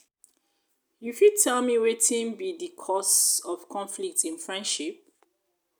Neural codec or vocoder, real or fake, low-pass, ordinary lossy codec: none; real; none; none